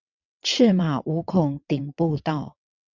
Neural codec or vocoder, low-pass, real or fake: vocoder, 22.05 kHz, 80 mel bands, WaveNeXt; 7.2 kHz; fake